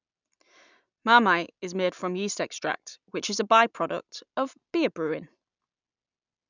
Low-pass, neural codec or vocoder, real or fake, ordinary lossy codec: 7.2 kHz; none; real; none